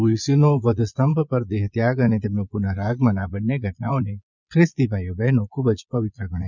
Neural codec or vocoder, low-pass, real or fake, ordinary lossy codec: vocoder, 22.05 kHz, 80 mel bands, Vocos; 7.2 kHz; fake; none